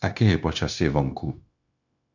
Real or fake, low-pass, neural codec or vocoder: fake; 7.2 kHz; codec, 16 kHz, 0.9 kbps, LongCat-Audio-Codec